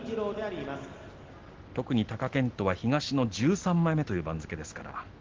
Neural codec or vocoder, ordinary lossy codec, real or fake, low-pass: none; Opus, 16 kbps; real; 7.2 kHz